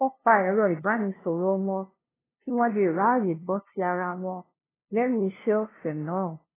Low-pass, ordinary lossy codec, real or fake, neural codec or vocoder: 3.6 kHz; AAC, 16 kbps; fake; codec, 16 kHz, 0.5 kbps, FunCodec, trained on LibriTTS, 25 frames a second